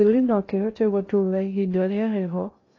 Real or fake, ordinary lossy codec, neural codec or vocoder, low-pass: fake; AAC, 32 kbps; codec, 16 kHz in and 24 kHz out, 0.6 kbps, FocalCodec, streaming, 2048 codes; 7.2 kHz